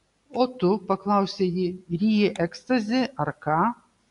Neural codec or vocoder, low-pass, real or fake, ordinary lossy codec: none; 10.8 kHz; real; AAC, 64 kbps